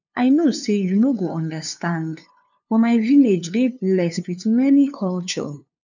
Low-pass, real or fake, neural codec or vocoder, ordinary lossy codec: 7.2 kHz; fake; codec, 16 kHz, 2 kbps, FunCodec, trained on LibriTTS, 25 frames a second; none